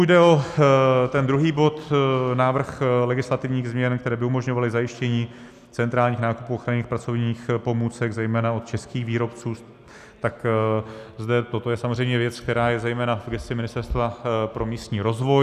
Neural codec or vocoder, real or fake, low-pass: none; real; 14.4 kHz